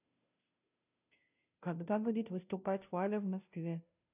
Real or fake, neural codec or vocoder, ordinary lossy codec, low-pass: fake; codec, 16 kHz, 0.5 kbps, FunCodec, trained on Chinese and English, 25 frames a second; none; 3.6 kHz